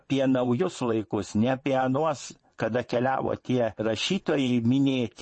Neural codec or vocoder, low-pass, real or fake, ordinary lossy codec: vocoder, 22.05 kHz, 80 mel bands, WaveNeXt; 9.9 kHz; fake; MP3, 32 kbps